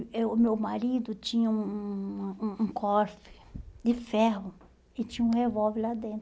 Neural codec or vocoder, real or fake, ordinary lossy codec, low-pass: none; real; none; none